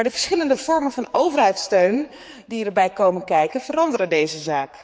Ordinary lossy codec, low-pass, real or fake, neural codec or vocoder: none; none; fake; codec, 16 kHz, 4 kbps, X-Codec, HuBERT features, trained on general audio